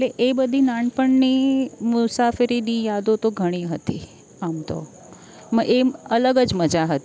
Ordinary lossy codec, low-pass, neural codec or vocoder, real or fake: none; none; none; real